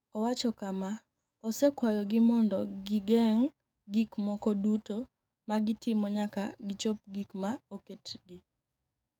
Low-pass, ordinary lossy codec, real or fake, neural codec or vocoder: 19.8 kHz; none; fake; codec, 44.1 kHz, 7.8 kbps, DAC